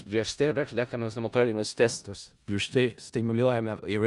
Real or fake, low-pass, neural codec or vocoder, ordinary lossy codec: fake; 10.8 kHz; codec, 16 kHz in and 24 kHz out, 0.4 kbps, LongCat-Audio-Codec, four codebook decoder; Opus, 64 kbps